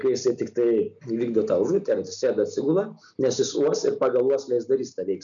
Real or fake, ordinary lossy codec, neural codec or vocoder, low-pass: real; MP3, 96 kbps; none; 7.2 kHz